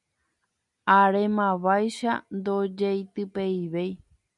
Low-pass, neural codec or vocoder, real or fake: 10.8 kHz; none; real